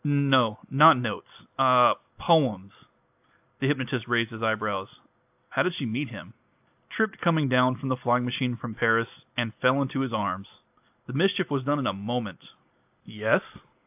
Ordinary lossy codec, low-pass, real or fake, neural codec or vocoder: AAC, 32 kbps; 3.6 kHz; real; none